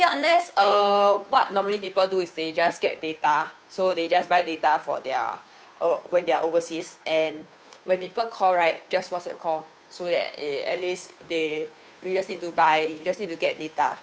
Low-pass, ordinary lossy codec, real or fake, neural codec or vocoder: none; none; fake; codec, 16 kHz, 2 kbps, FunCodec, trained on Chinese and English, 25 frames a second